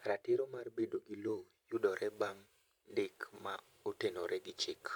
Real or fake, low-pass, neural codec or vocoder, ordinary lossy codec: fake; none; vocoder, 44.1 kHz, 128 mel bands every 512 samples, BigVGAN v2; none